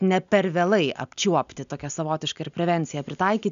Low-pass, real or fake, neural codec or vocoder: 7.2 kHz; real; none